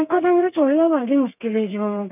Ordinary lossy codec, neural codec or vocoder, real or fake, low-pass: none; codec, 32 kHz, 1.9 kbps, SNAC; fake; 3.6 kHz